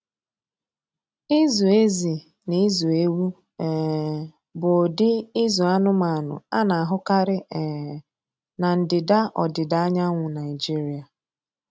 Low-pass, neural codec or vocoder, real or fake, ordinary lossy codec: none; none; real; none